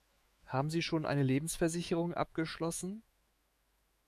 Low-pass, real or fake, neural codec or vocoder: 14.4 kHz; fake; autoencoder, 48 kHz, 128 numbers a frame, DAC-VAE, trained on Japanese speech